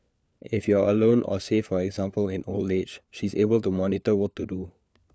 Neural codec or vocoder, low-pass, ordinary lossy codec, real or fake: codec, 16 kHz, 4 kbps, FunCodec, trained on LibriTTS, 50 frames a second; none; none; fake